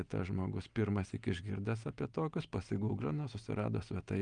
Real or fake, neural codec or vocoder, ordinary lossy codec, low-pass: real; none; Opus, 32 kbps; 9.9 kHz